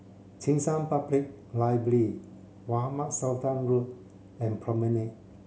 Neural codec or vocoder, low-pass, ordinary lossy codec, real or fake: none; none; none; real